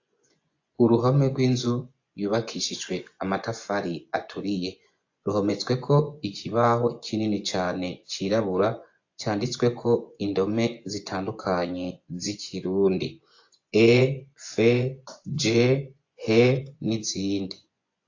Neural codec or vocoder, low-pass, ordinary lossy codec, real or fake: vocoder, 24 kHz, 100 mel bands, Vocos; 7.2 kHz; AAC, 48 kbps; fake